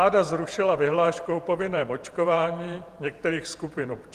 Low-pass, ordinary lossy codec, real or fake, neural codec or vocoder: 14.4 kHz; Opus, 24 kbps; fake; vocoder, 48 kHz, 128 mel bands, Vocos